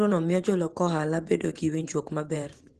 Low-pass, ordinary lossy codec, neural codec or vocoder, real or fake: 10.8 kHz; Opus, 16 kbps; vocoder, 24 kHz, 100 mel bands, Vocos; fake